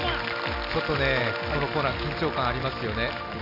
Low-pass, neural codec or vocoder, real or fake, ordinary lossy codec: 5.4 kHz; none; real; none